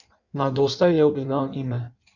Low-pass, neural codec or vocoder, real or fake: 7.2 kHz; codec, 16 kHz in and 24 kHz out, 1.1 kbps, FireRedTTS-2 codec; fake